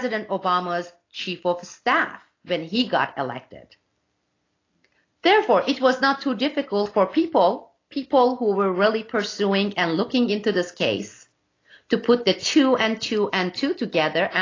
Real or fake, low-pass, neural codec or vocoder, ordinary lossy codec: real; 7.2 kHz; none; AAC, 32 kbps